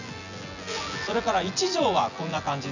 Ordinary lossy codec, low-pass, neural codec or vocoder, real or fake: none; 7.2 kHz; vocoder, 24 kHz, 100 mel bands, Vocos; fake